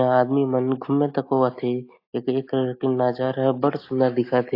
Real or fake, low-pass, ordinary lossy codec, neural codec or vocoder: real; 5.4 kHz; AAC, 32 kbps; none